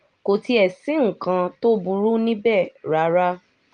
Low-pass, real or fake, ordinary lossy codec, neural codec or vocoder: 9.9 kHz; real; Opus, 32 kbps; none